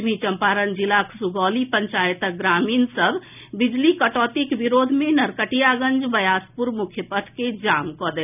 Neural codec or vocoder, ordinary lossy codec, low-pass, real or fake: none; none; 3.6 kHz; real